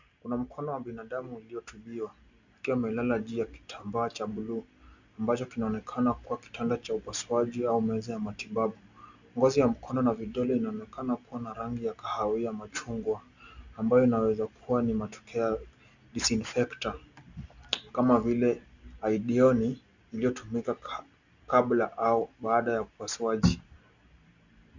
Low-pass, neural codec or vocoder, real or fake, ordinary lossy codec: 7.2 kHz; none; real; Opus, 64 kbps